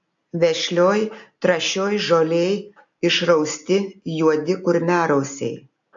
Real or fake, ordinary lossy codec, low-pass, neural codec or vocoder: real; AAC, 64 kbps; 7.2 kHz; none